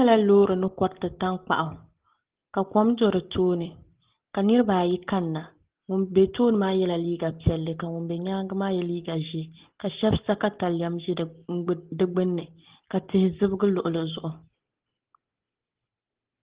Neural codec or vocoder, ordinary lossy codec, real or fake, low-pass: none; Opus, 16 kbps; real; 3.6 kHz